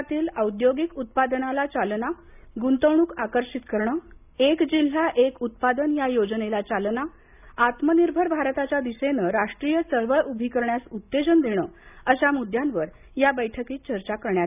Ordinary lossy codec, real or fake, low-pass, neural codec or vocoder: none; real; 3.6 kHz; none